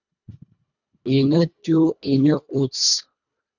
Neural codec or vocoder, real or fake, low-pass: codec, 24 kHz, 1.5 kbps, HILCodec; fake; 7.2 kHz